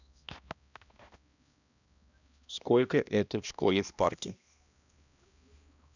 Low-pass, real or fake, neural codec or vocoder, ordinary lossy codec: 7.2 kHz; fake; codec, 16 kHz, 1 kbps, X-Codec, HuBERT features, trained on balanced general audio; none